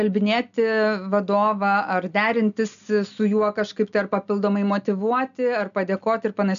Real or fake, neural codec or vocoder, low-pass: real; none; 7.2 kHz